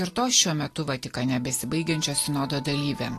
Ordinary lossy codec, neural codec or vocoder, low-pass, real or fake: AAC, 48 kbps; none; 14.4 kHz; real